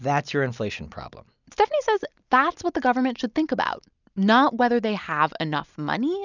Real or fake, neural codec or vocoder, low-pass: real; none; 7.2 kHz